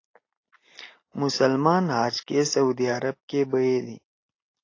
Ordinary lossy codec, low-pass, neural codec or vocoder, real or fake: AAC, 32 kbps; 7.2 kHz; none; real